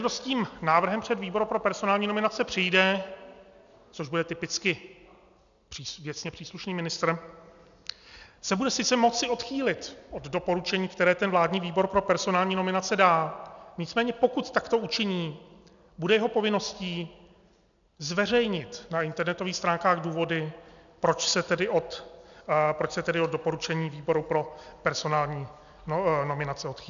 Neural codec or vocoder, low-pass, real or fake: none; 7.2 kHz; real